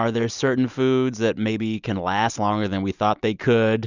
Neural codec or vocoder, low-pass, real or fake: none; 7.2 kHz; real